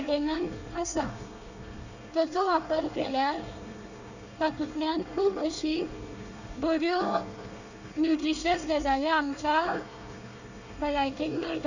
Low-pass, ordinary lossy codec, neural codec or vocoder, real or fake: 7.2 kHz; none; codec, 24 kHz, 1 kbps, SNAC; fake